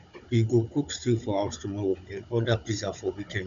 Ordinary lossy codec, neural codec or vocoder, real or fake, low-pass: none; codec, 16 kHz, 16 kbps, FunCodec, trained on Chinese and English, 50 frames a second; fake; 7.2 kHz